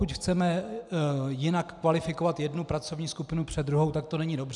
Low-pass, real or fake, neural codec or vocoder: 10.8 kHz; real; none